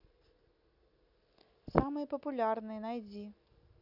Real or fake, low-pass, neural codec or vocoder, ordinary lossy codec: real; 5.4 kHz; none; none